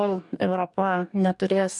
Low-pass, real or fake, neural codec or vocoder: 10.8 kHz; fake; codec, 44.1 kHz, 2.6 kbps, DAC